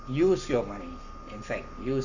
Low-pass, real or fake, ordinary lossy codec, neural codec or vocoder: 7.2 kHz; fake; none; codec, 44.1 kHz, 7.8 kbps, Pupu-Codec